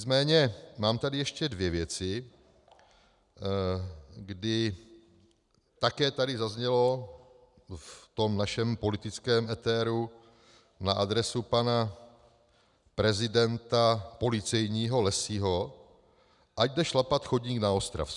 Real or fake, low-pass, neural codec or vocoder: real; 10.8 kHz; none